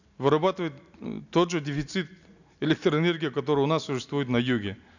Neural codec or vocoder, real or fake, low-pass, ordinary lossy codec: none; real; 7.2 kHz; none